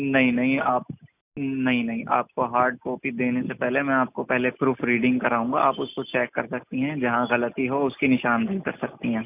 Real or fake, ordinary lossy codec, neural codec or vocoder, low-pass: real; none; none; 3.6 kHz